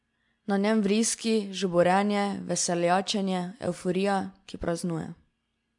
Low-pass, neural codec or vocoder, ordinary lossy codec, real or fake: 10.8 kHz; none; MP3, 64 kbps; real